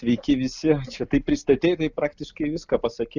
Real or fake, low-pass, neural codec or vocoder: real; 7.2 kHz; none